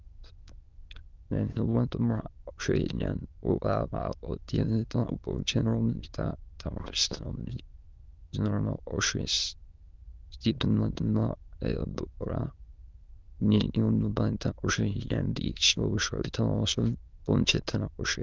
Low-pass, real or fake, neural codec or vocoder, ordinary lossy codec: 7.2 kHz; fake; autoencoder, 22.05 kHz, a latent of 192 numbers a frame, VITS, trained on many speakers; Opus, 32 kbps